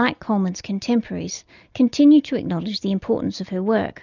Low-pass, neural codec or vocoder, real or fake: 7.2 kHz; none; real